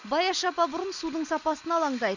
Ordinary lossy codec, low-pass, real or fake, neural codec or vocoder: none; 7.2 kHz; real; none